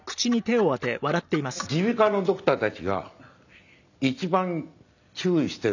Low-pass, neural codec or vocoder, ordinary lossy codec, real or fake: 7.2 kHz; none; none; real